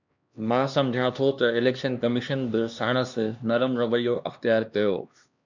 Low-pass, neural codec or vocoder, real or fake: 7.2 kHz; codec, 16 kHz, 2 kbps, X-Codec, HuBERT features, trained on LibriSpeech; fake